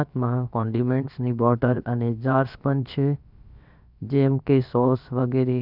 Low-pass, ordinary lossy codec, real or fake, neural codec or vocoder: 5.4 kHz; none; fake; codec, 16 kHz, about 1 kbps, DyCAST, with the encoder's durations